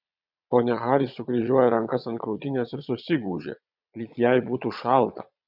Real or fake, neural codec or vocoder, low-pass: fake; vocoder, 22.05 kHz, 80 mel bands, Vocos; 5.4 kHz